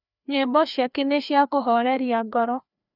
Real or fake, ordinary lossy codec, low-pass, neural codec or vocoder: fake; none; 5.4 kHz; codec, 16 kHz, 2 kbps, FreqCodec, larger model